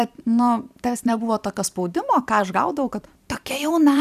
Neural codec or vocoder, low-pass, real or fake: vocoder, 44.1 kHz, 128 mel bands every 512 samples, BigVGAN v2; 14.4 kHz; fake